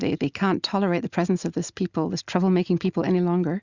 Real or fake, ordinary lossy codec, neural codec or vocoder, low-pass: real; Opus, 64 kbps; none; 7.2 kHz